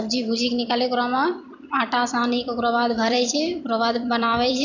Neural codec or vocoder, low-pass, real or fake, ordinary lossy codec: vocoder, 44.1 kHz, 128 mel bands every 256 samples, BigVGAN v2; 7.2 kHz; fake; none